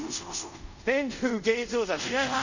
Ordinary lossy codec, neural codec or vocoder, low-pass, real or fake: none; codec, 24 kHz, 0.5 kbps, DualCodec; 7.2 kHz; fake